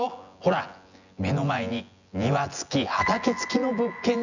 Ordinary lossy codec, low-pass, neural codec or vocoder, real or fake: none; 7.2 kHz; vocoder, 24 kHz, 100 mel bands, Vocos; fake